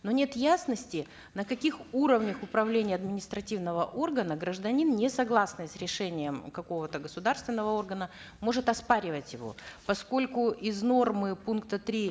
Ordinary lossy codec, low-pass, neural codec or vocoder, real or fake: none; none; none; real